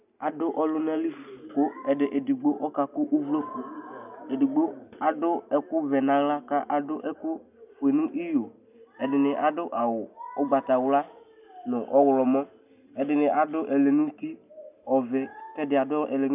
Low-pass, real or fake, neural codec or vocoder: 3.6 kHz; real; none